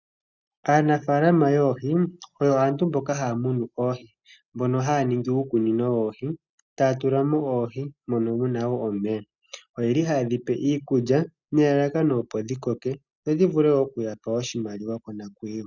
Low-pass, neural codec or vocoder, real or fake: 7.2 kHz; none; real